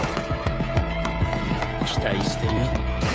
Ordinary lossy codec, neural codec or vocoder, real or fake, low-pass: none; codec, 16 kHz, 16 kbps, FreqCodec, smaller model; fake; none